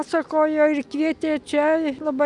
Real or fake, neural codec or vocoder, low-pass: real; none; 10.8 kHz